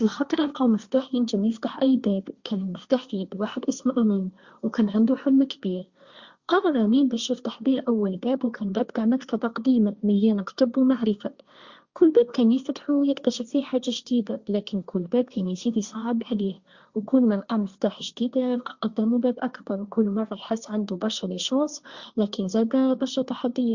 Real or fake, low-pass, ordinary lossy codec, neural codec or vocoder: fake; 7.2 kHz; Opus, 64 kbps; codec, 16 kHz, 1.1 kbps, Voila-Tokenizer